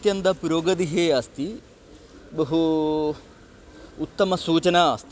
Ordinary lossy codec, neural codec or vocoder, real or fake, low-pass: none; none; real; none